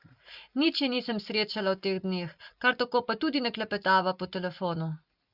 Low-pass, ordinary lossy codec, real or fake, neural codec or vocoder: 5.4 kHz; Opus, 64 kbps; real; none